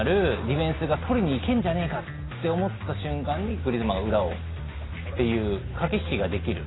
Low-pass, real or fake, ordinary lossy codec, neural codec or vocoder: 7.2 kHz; real; AAC, 16 kbps; none